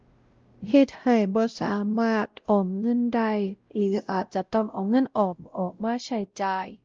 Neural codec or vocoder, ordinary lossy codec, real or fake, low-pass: codec, 16 kHz, 0.5 kbps, X-Codec, WavLM features, trained on Multilingual LibriSpeech; Opus, 32 kbps; fake; 7.2 kHz